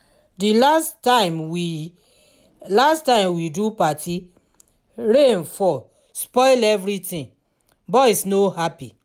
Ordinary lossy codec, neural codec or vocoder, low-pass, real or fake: none; none; 19.8 kHz; real